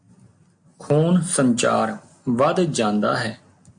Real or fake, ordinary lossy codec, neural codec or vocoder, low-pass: real; MP3, 48 kbps; none; 9.9 kHz